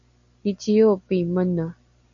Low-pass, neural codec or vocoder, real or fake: 7.2 kHz; none; real